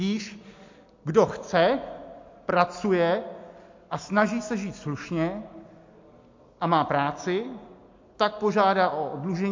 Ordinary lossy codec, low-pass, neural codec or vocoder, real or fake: MP3, 48 kbps; 7.2 kHz; none; real